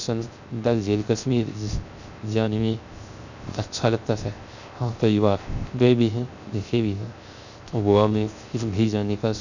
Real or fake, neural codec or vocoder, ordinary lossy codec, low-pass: fake; codec, 16 kHz, 0.3 kbps, FocalCodec; none; 7.2 kHz